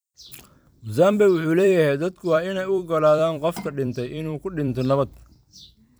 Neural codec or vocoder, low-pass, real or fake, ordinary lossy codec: vocoder, 44.1 kHz, 128 mel bands every 256 samples, BigVGAN v2; none; fake; none